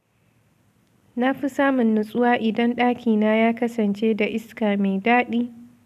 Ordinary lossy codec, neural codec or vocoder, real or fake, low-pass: none; none; real; 14.4 kHz